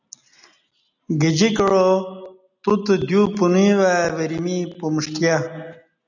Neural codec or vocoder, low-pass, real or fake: none; 7.2 kHz; real